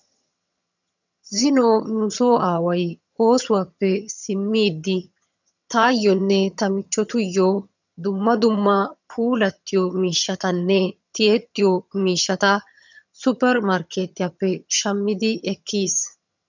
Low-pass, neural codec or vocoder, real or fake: 7.2 kHz; vocoder, 22.05 kHz, 80 mel bands, HiFi-GAN; fake